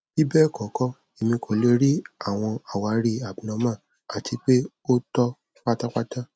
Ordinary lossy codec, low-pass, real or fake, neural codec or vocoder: none; none; real; none